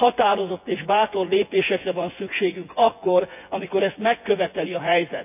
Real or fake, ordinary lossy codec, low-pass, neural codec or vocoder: fake; none; 3.6 kHz; vocoder, 24 kHz, 100 mel bands, Vocos